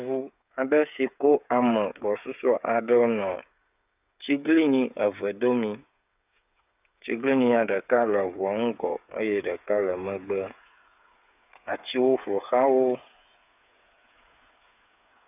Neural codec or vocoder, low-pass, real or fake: codec, 16 kHz, 8 kbps, FreqCodec, smaller model; 3.6 kHz; fake